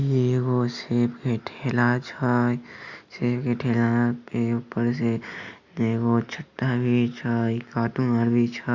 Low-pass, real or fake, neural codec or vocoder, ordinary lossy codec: 7.2 kHz; real; none; Opus, 64 kbps